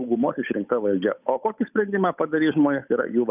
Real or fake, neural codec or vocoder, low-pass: fake; codec, 16 kHz, 8 kbps, FunCodec, trained on Chinese and English, 25 frames a second; 3.6 kHz